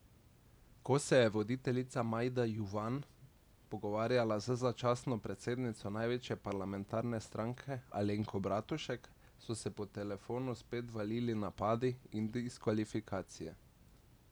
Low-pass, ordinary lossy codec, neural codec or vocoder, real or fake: none; none; none; real